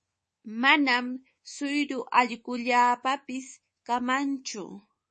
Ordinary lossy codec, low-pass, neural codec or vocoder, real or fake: MP3, 32 kbps; 10.8 kHz; codec, 24 kHz, 3.1 kbps, DualCodec; fake